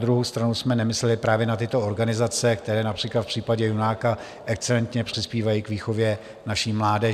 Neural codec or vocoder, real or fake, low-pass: none; real; 14.4 kHz